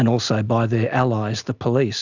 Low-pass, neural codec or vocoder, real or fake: 7.2 kHz; none; real